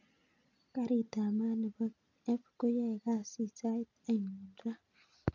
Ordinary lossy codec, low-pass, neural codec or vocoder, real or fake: none; 7.2 kHz; none; real